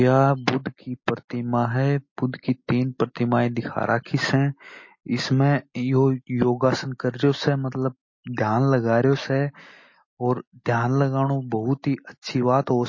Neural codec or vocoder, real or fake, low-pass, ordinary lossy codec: none; real; 7.2 kHz; MP3, 32 kbps